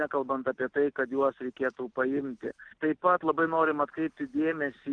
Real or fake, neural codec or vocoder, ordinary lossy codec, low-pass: real; none; Opus, 24 kbps; 9.9 kHz